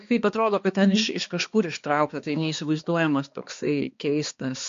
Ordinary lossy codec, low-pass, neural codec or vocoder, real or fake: MP3, 48 kbps; 7.2 kHz; codec, 16 kHz, 2 kbps, X-Codec, HuBERT features, trained on balanced general audio; fake